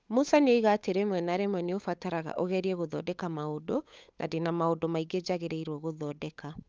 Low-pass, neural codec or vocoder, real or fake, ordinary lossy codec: none; codec, 16 kHz, 8 kbps, FunCodec, trained on Chinese and English, 25 frames a second; fake; none